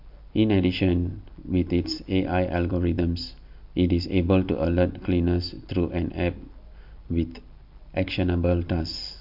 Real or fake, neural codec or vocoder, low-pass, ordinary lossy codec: real; none; 5.4 kHz; MP3, 48 kbps